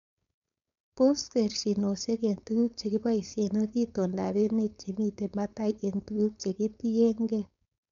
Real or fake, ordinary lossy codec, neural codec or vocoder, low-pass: fake; none; codec, 16 kHz, 4.8 kbps, FACodec; 7.2 kHz